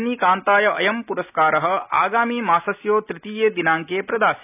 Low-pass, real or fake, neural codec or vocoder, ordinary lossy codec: 3.6 kHz; real; none; none